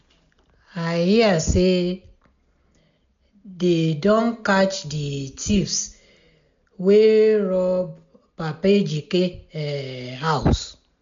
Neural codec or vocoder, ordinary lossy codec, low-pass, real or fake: none; MP3, 64 kbps; 7.2 kHz; real